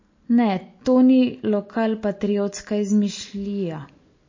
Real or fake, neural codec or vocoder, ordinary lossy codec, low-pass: real; none; MP3, 32 kbps; 7.2 kHz